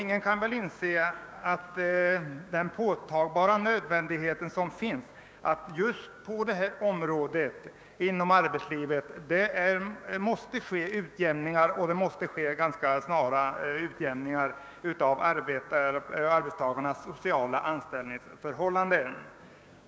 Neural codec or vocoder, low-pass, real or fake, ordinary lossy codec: codec, 16 kHz, 6 kbps, DAC; none; fake; none